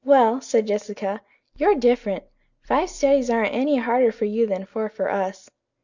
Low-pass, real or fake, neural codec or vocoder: 7.2 kHz; real; none